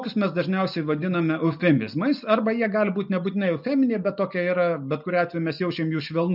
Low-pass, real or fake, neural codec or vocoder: 5.4 kHz; real; none